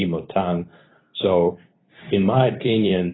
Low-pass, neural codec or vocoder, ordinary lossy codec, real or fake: 7.2 kHz; codec, 24 kHz, 0.9 kbps, WavTokenizer, medium speech release version 2; AAC, 16 kbps; fake